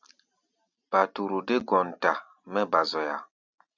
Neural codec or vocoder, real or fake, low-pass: none; real; 7.2 kHz